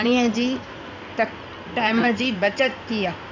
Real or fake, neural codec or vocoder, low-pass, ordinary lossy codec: fake; codec, 16 kHz in and 24 kHz out, 2.2 kbps, FireRedTTS-2 codec; 7.2 kHz; none